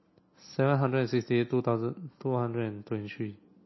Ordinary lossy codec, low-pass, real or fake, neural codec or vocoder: MP3, 24 kbps; 7.2 kHz; real; none